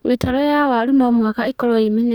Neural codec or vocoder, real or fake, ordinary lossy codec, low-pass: codec, 44.1 kHz, 2.6 kbps, DAC; fake; none; 19.8 kHz